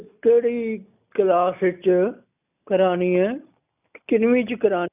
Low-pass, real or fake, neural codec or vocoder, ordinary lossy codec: 3.6 kHz; real; none; none